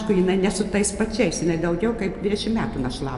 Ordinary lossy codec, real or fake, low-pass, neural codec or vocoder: AAC, 96 kbps; real; 10.8 kHz; none